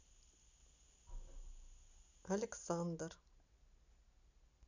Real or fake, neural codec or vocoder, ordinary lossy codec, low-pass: real; none; none; 7.2 kHz